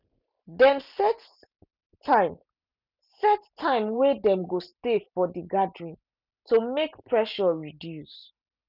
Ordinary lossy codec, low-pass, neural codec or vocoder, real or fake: none; 5.4 kHz; none; real